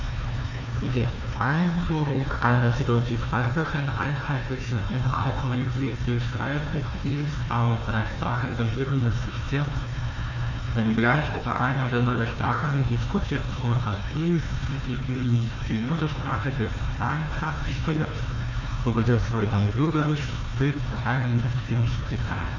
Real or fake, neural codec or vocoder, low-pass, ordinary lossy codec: fake; codec, 16 kHz, 1 kbps, FunCodec, trained on Chinese and English, 50 frames a second; 7.2 kHz; none